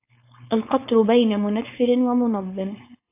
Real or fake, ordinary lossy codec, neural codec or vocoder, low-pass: fake; AAC, 24 kbps; codec, 16 kHz, 4.8 kbps, FACodec; 3.6 kHz